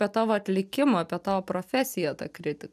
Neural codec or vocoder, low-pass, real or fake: none; 14.4 kHz; real